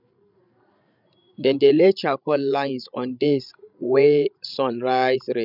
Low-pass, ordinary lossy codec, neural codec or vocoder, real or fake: 5.4 kHz; none; codec, 16 kHz, 16 kbps, FreqCodec, larger model; fake